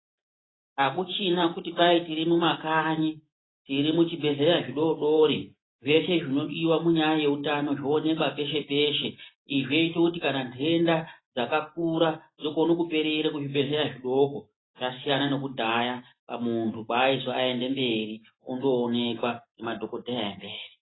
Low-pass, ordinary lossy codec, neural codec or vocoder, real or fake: 7.2 kHz; AAC, 16 kbps; none; real